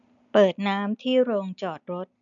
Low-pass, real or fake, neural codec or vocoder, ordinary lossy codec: 7.2 kHz; real; none; none